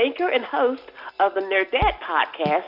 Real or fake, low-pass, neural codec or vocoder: real; 5.4 kHz; none